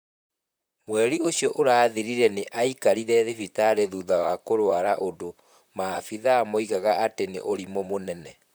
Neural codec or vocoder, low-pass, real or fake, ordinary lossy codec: vocoder, 44.1 kHz, 128 mel bands, Pupu-Vocoder; none; fake; none